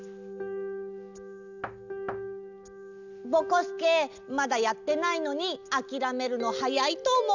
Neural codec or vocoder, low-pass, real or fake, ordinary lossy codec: none; 7.2 kHz; real; none